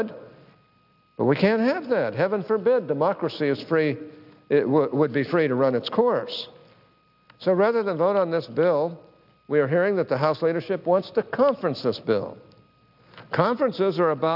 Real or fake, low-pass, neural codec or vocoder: real; 5.4 kHz; none